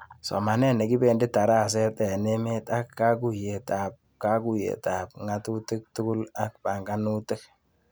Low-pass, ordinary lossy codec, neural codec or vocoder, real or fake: none; none; none; real